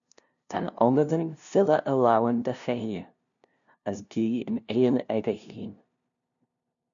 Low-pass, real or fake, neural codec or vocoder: 7.2 kHz; fake; codec, 16 kHz, 0.5 kbps, FunCodec, trained on LibriTTS, 25 frames a second